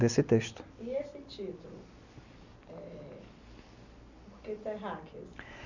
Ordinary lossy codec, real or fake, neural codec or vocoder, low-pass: none; real; none; 7.2 kHz